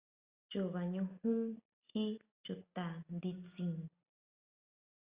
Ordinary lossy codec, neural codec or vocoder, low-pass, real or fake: Opus, 64 kbps; none; 3.6 kHz; real